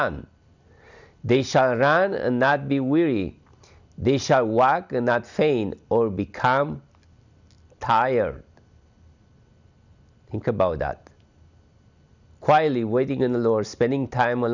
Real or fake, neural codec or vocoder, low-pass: real; none; 7.2 kHz